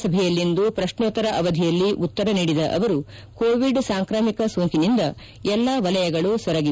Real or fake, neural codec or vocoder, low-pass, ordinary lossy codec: real; none; none; none